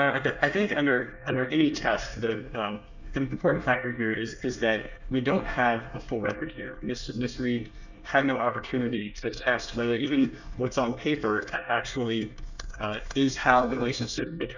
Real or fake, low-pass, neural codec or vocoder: fake; 7.2 kHz; codec, 24 kHz, 1 kbps, SNAC